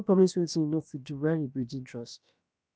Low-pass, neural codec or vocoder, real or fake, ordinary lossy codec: none; codec, 16 kHz, about 1 kbps, DyCAST, with the encoder's durations; fake; none